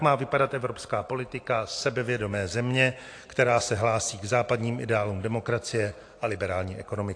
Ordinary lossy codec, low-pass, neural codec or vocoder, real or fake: AAC, 48 kbps; 9.9 kHz; none; real